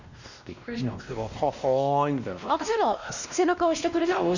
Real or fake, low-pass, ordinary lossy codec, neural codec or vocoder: fake; 7.2 kHz; none; codec, 16 kHz, 1 kbps, X-Codec, WavLM features, trained on Multilingual LibriSpeech